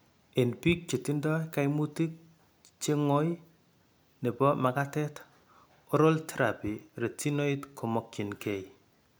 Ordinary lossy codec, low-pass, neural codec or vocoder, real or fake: none; none; none; real